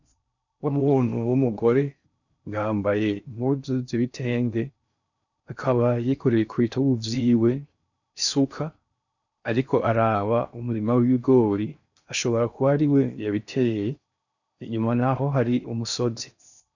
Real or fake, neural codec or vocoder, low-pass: fake; codec, 16 kHz in and 24 kHz out, 0.6 kbps, FocalCodec, streaming, 4096 codes; 7.2 kHz